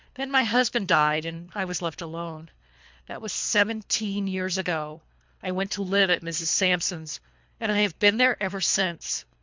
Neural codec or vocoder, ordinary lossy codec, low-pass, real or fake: codec, 24 kHz, 3 kbps, HILCodec; MP3, 64 kbps; 7.2 kHz; fake